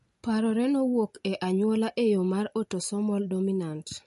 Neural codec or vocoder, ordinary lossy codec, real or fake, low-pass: none; MP3, 48 kbps; real; 14.4 kHz